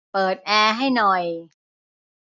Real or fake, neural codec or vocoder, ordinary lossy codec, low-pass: real; none; none; 7.2 kHz